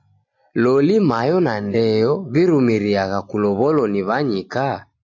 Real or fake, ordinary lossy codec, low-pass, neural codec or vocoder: real; AAC, 48 kbps; 7.2 kHz; none